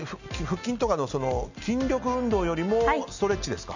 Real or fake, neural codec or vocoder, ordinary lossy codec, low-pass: real; none; none; 7.2 kHz